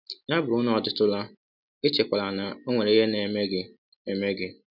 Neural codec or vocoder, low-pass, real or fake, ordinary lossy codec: none; 5.4 kHz; real; none